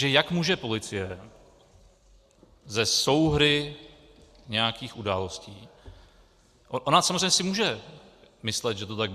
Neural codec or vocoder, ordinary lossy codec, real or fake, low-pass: none; Opus, 64 kbps; real; 14.4 kHz